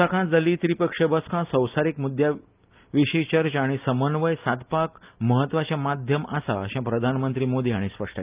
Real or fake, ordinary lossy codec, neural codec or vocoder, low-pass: real; Opus, 24 kbps; none; 3.6 kHz